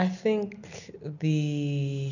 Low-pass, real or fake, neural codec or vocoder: 7.2 kHz; real; none